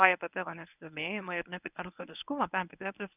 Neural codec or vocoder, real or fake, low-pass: codec, 24 kHz, 0.9 kbps, WavTokenizer, medium speech release version 1; fake; 3.6 kHz